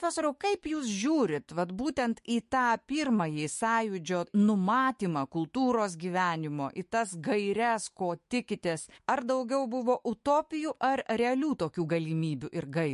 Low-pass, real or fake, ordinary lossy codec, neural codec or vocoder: 14.4 kHz; fake; MP3, 48 kbps; autoencoder, 48 kHz, 128 numbers a frame, DAC-VAE, trained on Japanese speech